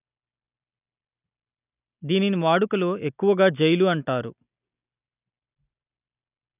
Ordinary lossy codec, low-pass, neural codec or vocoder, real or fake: none; 3.6 kHz; none; real